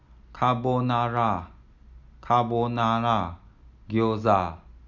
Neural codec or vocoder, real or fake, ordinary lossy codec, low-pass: none; real; none; 7.2 kHz